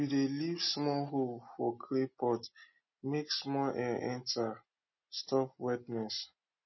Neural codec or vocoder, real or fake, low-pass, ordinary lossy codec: none; real; 7.2 kHz; MP3, 24 kbps